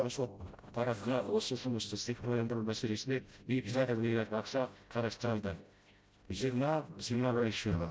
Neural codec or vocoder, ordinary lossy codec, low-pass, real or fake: codec, 16 kHz, 0.5 kbps, FreqCodec, smaller model; none; none; fake